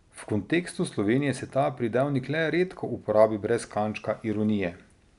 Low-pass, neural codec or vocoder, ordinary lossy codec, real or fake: 10.8 kHz; none; none; real